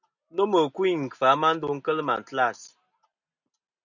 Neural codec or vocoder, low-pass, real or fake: none; 7.2 kHz; real